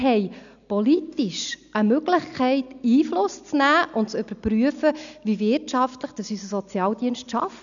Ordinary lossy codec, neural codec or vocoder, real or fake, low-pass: none; none; real; 7.2 kHz